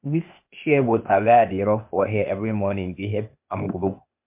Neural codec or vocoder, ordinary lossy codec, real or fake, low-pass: codec, 16 kHz, 0.8 kbps, ZipCodec; MP3, 32 kbps; fake; 3.6 kHz